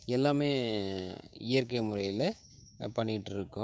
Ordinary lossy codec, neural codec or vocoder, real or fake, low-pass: none; codec, 16 kHz, 6 kbps, DAC; fake; none